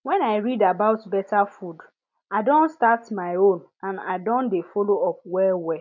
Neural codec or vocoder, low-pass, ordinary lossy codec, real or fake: none; 7.2 kHz; none; real